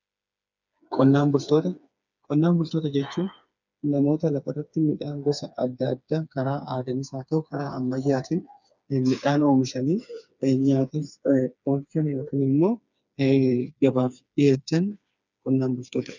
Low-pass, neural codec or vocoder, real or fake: 7.2 kHz; codec, 16 kHz, 4 kbps, FreqCodec, smaller model; fake